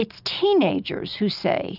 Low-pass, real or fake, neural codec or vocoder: 5.4 kHz; real; none